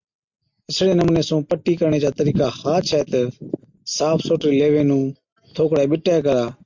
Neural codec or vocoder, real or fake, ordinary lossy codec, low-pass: none; real; MP3, 64 kbps; 7.2 kHz